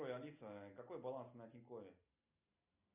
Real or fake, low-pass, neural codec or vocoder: real; 3.6 kHz; none